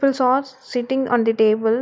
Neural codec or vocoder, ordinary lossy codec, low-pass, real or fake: none; none; none; real